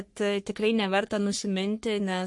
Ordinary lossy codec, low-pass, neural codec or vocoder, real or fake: MP3, 48 kbps; 10.8 kHz; codec, 44.1 kHz, 3.4 kbps, Pupu-Codec; fake